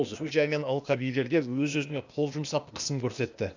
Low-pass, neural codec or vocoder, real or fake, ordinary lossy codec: 7.2 kHz; codec, 16 kHz, 0.8 kbps, ZipCodec; fake; none